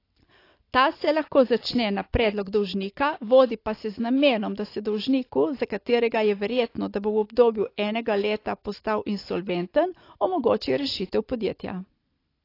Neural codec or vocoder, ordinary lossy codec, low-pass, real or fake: none; AAC, 32 kbps; 5.4 kHz; real